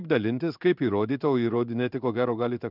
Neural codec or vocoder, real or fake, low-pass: codec, 16 kHz in and 24 kHz out, 1 kbps, XY-Tokenizer; fake; 5.4 kHz